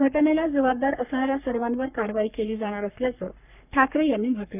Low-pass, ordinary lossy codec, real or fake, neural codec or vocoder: 3.6 kHz; none; fake; codec, 44.1 kHz, 3.4 kbps, Pupu-Codec